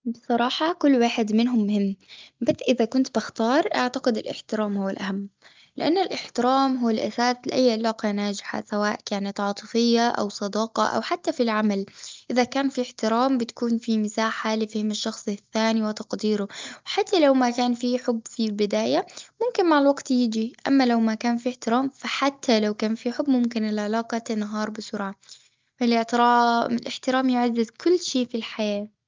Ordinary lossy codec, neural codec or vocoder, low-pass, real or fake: Opus, 24 kbps; none; 7.2 kHz; real